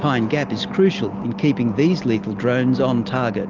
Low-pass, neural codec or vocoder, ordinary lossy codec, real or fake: 7.2 kHz; none; Opus, 32 kbps; real